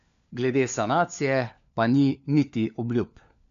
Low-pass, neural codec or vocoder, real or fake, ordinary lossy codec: 7.2 kHz; codec, 16 kHz, 4 kbps, FunCodec, trained on LibriTTS, 50 frames a second; fake; MP3, 64 kbps